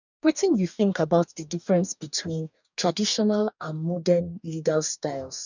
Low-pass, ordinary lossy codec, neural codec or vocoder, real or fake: 7.2 kHz; none; codec, 44.1 kHz, 2.6 kbps, DAC; fake